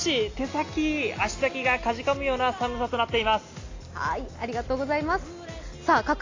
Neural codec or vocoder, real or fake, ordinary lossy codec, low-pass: none; real; AAC, 32 kbps; 7.2 kHz